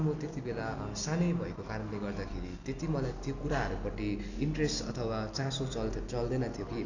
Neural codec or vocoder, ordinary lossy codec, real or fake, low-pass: none; none; real; 7.2 kHz